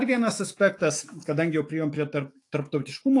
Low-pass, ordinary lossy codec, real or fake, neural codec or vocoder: 10.8 kHz; AAC, 48 kbps; fake; autoencoder, 48 kHz, 128 numbers a frame, DAC-VAE, trained on Japanese speech